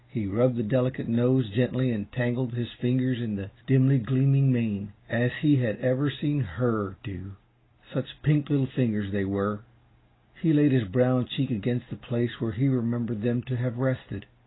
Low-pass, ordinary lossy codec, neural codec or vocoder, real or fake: 7.2 kHz; AAC, 16 kbps; none; real